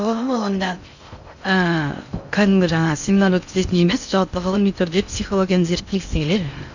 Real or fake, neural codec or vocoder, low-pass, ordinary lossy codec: fake; codec, 16 kHz in and 24 kHz out, 0.6 kbps, FocalCodec, streaming, 2048 codes; 7.2 kHz; none